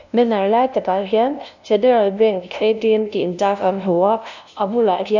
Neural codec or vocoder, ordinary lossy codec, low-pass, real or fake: codec, 16 kHz, 0.5 kbps, FunCodec, trained on LibriTTS, 25 frames a second; none; 7.2 kHz; fake